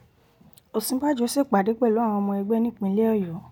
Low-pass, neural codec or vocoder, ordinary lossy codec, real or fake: none; none; none; real